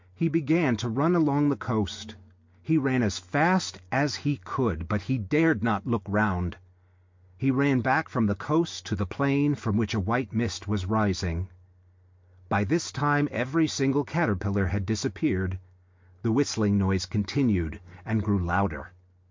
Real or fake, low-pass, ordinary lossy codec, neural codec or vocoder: real; 7.2 kHz; MP3, 48 kbps; none